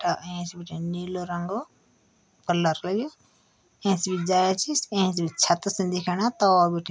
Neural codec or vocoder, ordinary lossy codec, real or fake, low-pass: none; none; real; none